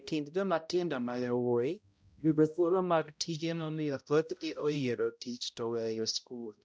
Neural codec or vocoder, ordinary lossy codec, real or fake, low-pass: codec, 16 kHz, 0.5 kbps, X-Codec, HuBERT features, trained on balanced general audio; none; fake; none